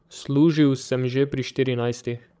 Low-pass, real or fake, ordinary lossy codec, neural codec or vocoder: none; fake; none; codec, 16 kHz, 16 kbps, FreqCodec, larger model